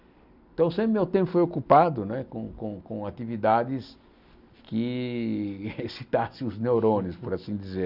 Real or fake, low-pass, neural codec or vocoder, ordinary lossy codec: real; 5.4 kHz; none; none